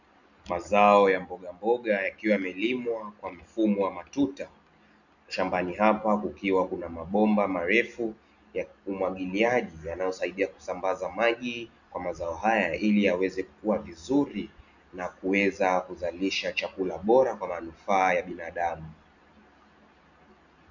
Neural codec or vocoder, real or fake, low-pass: none; real; 7.2 kHz